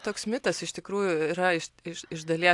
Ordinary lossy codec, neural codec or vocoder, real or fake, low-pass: AAC, 64 kbps; none; real; 10.8 kHz